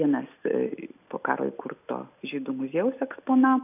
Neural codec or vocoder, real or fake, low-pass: none; real; 3.6 kHz